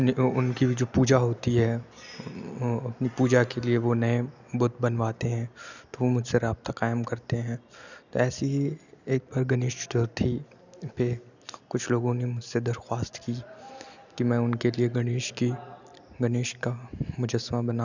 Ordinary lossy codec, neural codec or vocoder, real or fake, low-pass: none; none; real; 7.2 kHz